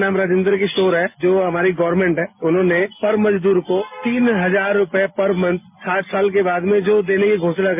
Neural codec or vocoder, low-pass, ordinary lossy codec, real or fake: none; 3.6 kHz; MP3, 32 kbps; real